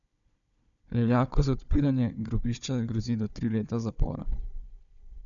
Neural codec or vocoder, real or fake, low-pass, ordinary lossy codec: codec, 16 kHz, 4 kbps, FunCodec, trained on Chinese and English, 50 frames a second; fake; 7.2 kHz; none